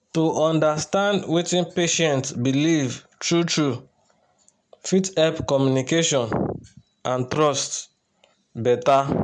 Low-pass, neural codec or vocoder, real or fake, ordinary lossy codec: 9.9 kHz; none; real; none